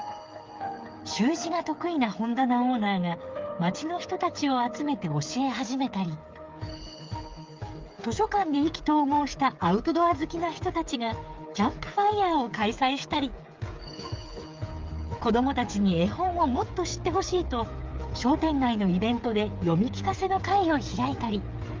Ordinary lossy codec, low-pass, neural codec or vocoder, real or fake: Opus, 24 kbps; 7.2 kHz; codec, 16 kHz, 8 kbps, FreqCodec, smaller model; fake